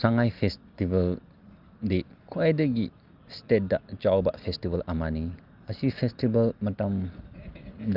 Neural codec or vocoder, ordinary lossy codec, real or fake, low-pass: none; Opus, 24 kbps; real; 5.4 kHz